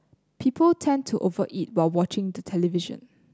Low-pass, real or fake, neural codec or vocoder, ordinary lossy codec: none; real; none; none